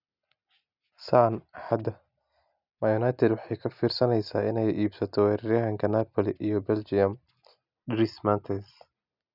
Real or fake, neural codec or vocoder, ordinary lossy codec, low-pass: real; none; none; 5.4 kHz